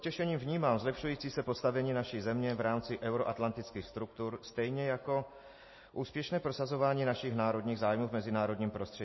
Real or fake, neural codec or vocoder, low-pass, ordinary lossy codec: real; none; 7.2 kHz; MP3, 24 kbps